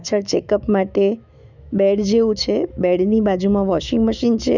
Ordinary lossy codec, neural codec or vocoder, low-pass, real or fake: none; autoencoder, 48 kHz, 128 numbers a frame, DAC-VAE, trained on Japanese speech; 7.2 kHz; fake